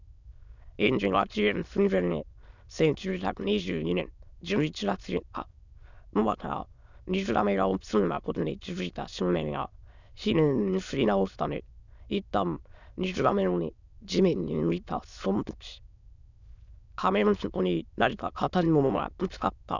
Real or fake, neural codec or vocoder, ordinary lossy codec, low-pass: fake; autoencoder, 22.05 kHz, a latent of 192 numbers a frame, VITS, trained on many speakers; none; 7.2 kHz